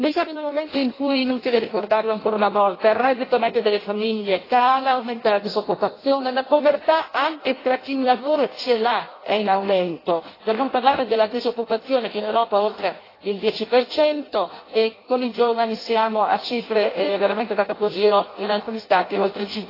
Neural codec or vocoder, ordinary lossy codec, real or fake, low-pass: codec, 16 kHz in and 24 kHz out, 0.6 kbps, FireRedTTS-2 codec; AAC, 24 kbps; fake; 5.4 kHz